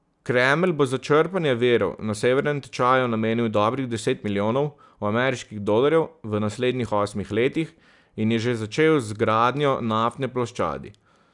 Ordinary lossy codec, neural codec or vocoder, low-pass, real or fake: none; none; 10.8 kHz; real